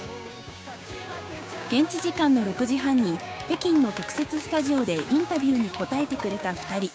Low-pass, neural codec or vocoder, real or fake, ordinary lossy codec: none; codec, 16 kHz, 6 kbps, DAC; fake; none